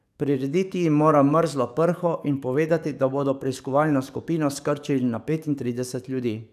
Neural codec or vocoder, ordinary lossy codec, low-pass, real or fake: codec, 44.1 kHz, 7.8 kbps, DAC; none; 14.4 kHz; fake